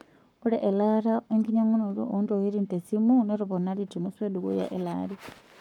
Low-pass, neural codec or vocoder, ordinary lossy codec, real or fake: 19.8 kHz; codec, 44.1 kHz, 7.8 kbps, Pupu-Codec; none; fake